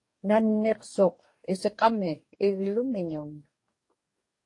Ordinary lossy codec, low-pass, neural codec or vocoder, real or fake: AAC, 48 kbps; 10.8 kHz; codec, 44.1 kHz, 2.6 kbps, DAC; fake